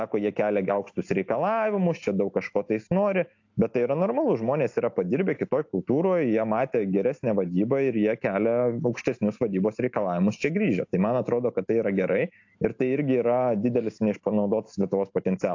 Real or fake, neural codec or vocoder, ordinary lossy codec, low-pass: real; none; AAC, 48 kbps; 7.2 kHz